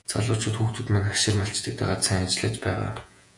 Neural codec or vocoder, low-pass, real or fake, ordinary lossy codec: vocoder, 48 kHz, 128 mel bands, Vocos; 10.8 kHz; fake; AAC, 64 kbps